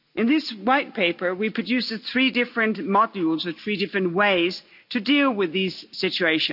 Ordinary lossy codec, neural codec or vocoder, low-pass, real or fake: none; none; 5.4 kHz; real